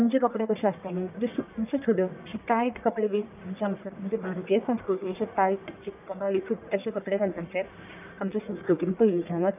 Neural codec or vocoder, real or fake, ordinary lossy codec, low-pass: codec, 44.1 kHz, 1.7 kbps, Pupu-Codec; fake; none; 3.6 kHz